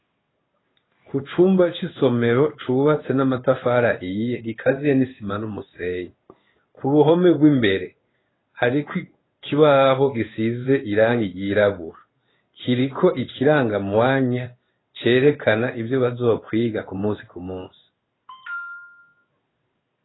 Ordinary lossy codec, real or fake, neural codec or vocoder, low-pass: AAC, 16 kbps; fake; codec, 16 kHz in and 24 kHz out, 1 kbps, XY-Tokenizer; 7.2 kHz